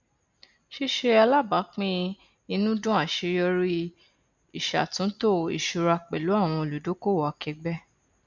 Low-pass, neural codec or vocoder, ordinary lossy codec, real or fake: 7.2 kHz; none; none; real